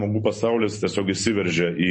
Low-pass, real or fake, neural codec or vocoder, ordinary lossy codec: 9.9 kHz; real; none; MP3, 32 kbps